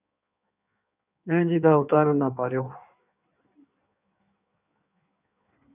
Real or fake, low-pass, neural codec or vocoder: fake; 3.6 kHz; codec, 16 kHz in and 24 kHz out, 1.1 kbps, FireRedTTS-2 codec